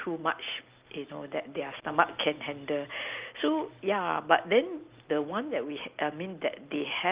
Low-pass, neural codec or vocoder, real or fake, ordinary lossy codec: 3.6 kHz; none; real; Opus, 32 kbps